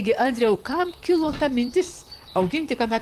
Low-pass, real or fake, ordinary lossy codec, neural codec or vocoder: 14.4 kHz; fake; Opus, 32 kbps; vocoder, 44.1 kHz, 128 mel bands, Pupu-Vocoder